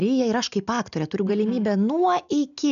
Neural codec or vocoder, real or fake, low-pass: none; real; 7.2 kHz